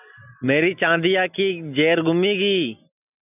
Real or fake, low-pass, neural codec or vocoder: real; 3.6 kHz; none